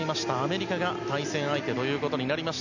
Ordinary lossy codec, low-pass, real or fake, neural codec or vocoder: none; 7.2 kHz; real; none